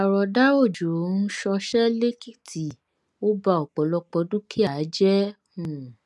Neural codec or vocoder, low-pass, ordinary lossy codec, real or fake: none; none; none; real